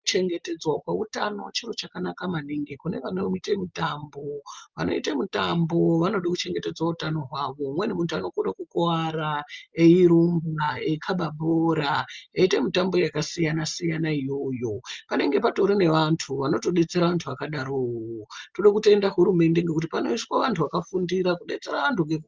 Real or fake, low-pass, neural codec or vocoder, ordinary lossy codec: real; 7.2 kHz; none; Opus, 32 kbps